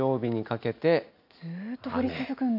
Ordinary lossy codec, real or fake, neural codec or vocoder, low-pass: none; real; none; 5.4 kHz